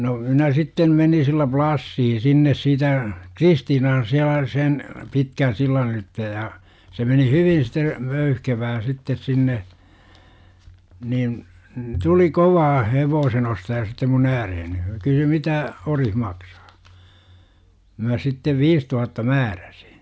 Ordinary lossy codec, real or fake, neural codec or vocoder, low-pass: none; real; none; none